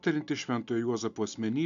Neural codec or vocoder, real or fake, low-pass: none; real; 7.2 kHz